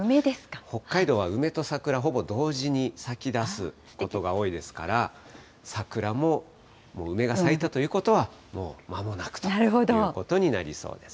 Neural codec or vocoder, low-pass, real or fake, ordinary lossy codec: none; none; real; none